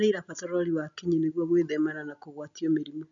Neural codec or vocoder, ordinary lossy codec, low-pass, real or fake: none; none; 7.2 kHz; real